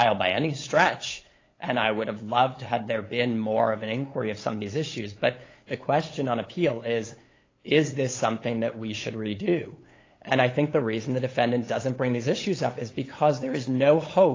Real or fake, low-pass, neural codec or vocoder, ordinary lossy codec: fake; 7.2 kHz; codec, 16 kHz, 8 kbps, FunCodec, trained on LibriTTS, 25 frames a second; AAC, 32 kbps